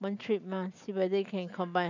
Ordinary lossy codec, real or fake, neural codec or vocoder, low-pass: none; fake; vocoder, 44.1 kHz, 128 mel bands every 512 samples, BigVGAN v2; 7.2 kHz